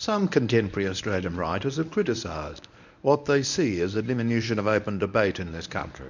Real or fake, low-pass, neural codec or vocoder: fake; 7.2 kHz; codec, 24 kHz, 0.9 kbps, WavTokenizer, medium speech release version 1